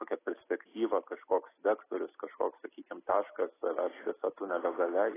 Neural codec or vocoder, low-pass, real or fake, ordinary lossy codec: none; 3.6 kHz; real; AAC, 16 kbps